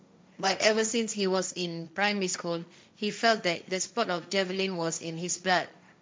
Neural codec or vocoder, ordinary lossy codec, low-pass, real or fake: codec, 16 kHz, 1.1 kbps, Voila-Tokenizer; none; none; fake